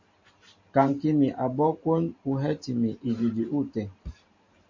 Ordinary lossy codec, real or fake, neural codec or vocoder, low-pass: MP3, 48 kbps; fake; vocoder, 44.1 kHz, 128 mel bands every 512 samples, BigVGAN v2; 7.2 kHz